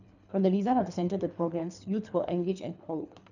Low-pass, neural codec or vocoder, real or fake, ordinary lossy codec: 7.2 kHz; codec, 24 kHz, 3 kbps, HILCodec; fake; none